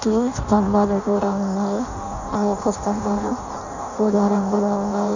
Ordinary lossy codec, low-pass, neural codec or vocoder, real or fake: none; 7.2 kHz; codec, 16 kHz in and 24 kHz out, 0.6 kbps, FireRedTTS-2 codec; fake